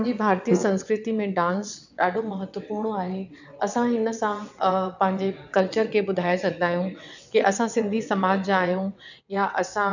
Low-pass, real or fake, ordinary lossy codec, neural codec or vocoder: 7.2 kHz; fake; none; vocoder, 22.05 kHz, 80 mel bands, WaveNeXt